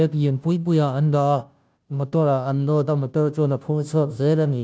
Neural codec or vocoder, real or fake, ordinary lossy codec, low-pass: codec, 16 kHz, 0.5 kbps, FunCodec, trained on Chinese and English, 25 frames a second; fake; none; none